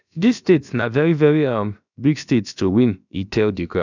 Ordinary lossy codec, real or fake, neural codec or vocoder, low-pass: none; fake; codec, 16 kHz, about 1 kbps, DyCAST, with the encoder's durations; 7.2 kHz